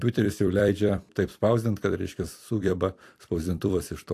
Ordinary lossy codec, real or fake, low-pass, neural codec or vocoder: AAC, 64 kbps; fake; 14.4 kHz; vocoder, 44.1 kHz, 128 mel bands every 256 samples, BigVGAN v2